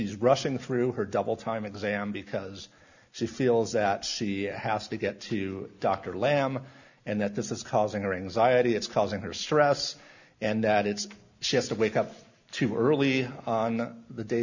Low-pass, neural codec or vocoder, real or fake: 7.2 kHz; none; real